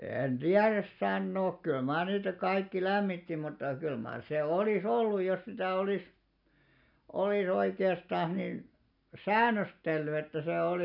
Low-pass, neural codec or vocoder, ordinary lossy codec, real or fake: 5.4 kHz; none; none; real